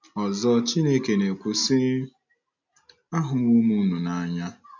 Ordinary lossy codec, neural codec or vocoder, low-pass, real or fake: none; none; 7.2 kHz; real